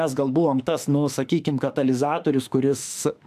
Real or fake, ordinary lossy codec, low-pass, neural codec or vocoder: fake; AAC, 96 kbps; 14.4 kHz; autoencoder, 48 kHz, 32 numbers a frame, DAC-VAE, trained on Japanese speech